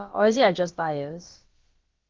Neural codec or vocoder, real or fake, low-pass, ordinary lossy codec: codec, 16 kHz, about 1 kbps, DyCAST, with the encoder's durations; fake; 7.2 kHz; Opus, 16 kbps